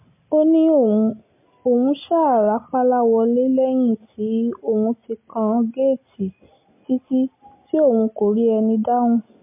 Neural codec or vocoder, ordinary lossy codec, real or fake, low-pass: none; MP3, 24 kbps; real; 3.6 kHz